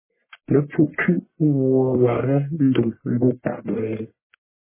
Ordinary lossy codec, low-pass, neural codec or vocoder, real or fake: MP3, 16 kbps; 3.6 kHz; codec, 44.1 kHz, 1.7 kbps, Pupu-Codec; fake